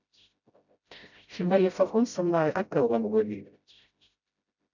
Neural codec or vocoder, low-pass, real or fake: codec, 16 kHz, 0.5 kbps, FreqCodec, smaller model; 7.2 kHz; fake